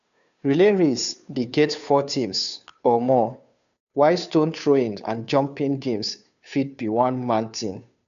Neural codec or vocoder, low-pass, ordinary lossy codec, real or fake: codec, 16 kHz, 2 kbps, FunCodec, trained on Chinese and English, 25 frames a second; 7.2 kHz; none; fake